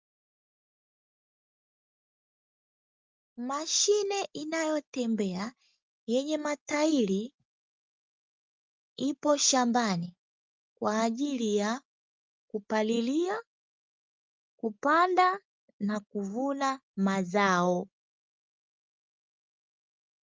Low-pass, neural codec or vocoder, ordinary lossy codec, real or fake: 7.2 kHz; none; Opus, 24 kbps; real